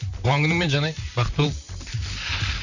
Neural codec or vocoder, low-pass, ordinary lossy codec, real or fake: vocoder, 44.1 kHz, 128 mel bands, Pupu-Vocoder; 7.2 kHz; none; fake